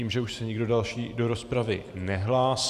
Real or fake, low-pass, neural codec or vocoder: fake; 14.4 kHz; vocoder, 44.1 kHz, 128 mel bands every 512 samples, BigVGAN v2